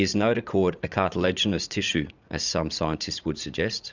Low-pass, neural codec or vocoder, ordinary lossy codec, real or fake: 7.2 kHz; vocoder, 44.1 kHz, 80 mel bands, Vocos; Opus, 64 kbps; fake